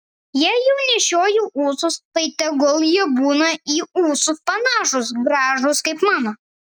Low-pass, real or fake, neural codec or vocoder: 19.8 kHz; real; none